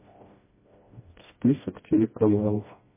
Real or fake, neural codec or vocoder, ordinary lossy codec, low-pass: fake; codec, 16 kHz, 1 kbps, FreqCodec, smaller model; MP3, 16 kbps; 3.6 kHz